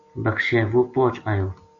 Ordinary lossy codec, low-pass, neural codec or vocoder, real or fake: AAC, 48 kbps; 7.2 kHz; none; real